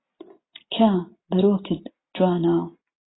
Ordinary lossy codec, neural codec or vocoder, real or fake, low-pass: AAC, 16 kbps; none; real; 7.2 kHz